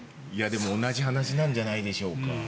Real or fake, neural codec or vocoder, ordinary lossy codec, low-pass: real; none; none; none